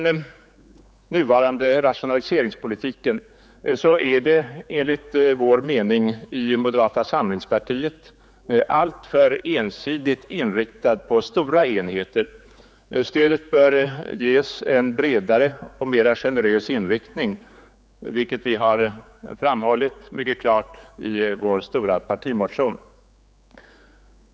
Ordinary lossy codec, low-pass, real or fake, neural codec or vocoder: none; none; fake; codec, 16 kHz, 4 kbps, X-Codec, HuBERT features, trained on general audio